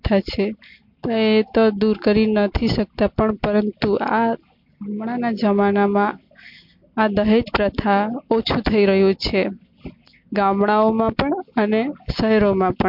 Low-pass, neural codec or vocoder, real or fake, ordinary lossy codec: 5.4 kHz; none; real; MP3, 48 kbps